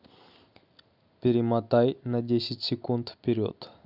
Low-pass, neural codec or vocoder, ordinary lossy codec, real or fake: 5.4 kHz; none; none; real